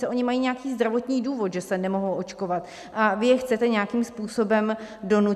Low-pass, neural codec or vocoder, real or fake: 14.4 kHz; none; real